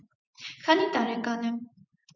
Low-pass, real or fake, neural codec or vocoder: 7.2 kHz; fake; vocoder, 24 kHz, 100 mel bands, Vocos